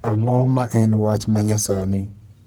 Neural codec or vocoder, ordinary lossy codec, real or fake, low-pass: codec, 44.1 kHz, 1.7 kbps, Pupu-Codec; none; fake; none